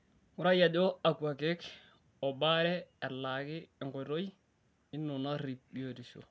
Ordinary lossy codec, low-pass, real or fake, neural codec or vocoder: none; none; real; none